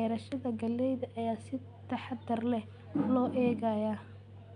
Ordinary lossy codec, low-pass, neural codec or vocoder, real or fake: none; 9.9 kHz; none; real